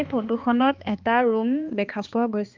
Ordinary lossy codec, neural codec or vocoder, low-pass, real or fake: Opus, 24 kbps; codec, 16 kHz, 2 kbps, X-Codec, HuBERT features, trained on balanced general audio; 7.2 kHz; fake